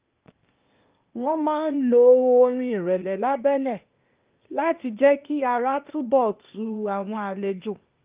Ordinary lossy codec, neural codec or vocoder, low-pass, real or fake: Opus, 32 kbps; codec, 16 kHz, 0.8 kbps, ZipCodec; 3.6 kHz; fake